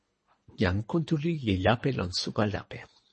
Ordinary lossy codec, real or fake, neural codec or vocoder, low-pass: MP3, 32 kbps; fake; codec, 24 kHz, 3 kbps, HILCodec; 10.8 kHz